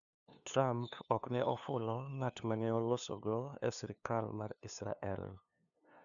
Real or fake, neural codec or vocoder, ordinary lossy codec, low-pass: fake; codec, 16 kHz, 2 kbps, FunCodec, trained on LibriTTS, 25 frames a second; none; 7.2 kHz